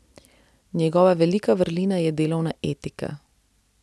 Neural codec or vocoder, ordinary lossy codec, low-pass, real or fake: none; none; none; real